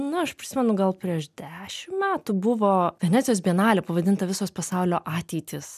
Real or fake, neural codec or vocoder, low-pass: real; none; 14.4 kHz